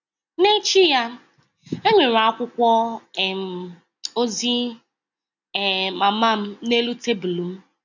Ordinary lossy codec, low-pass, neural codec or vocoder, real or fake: none; 7.2 kHz; none; real